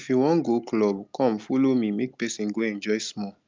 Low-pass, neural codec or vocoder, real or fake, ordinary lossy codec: 7.2 kHz; none; real; Opus, 24 kbps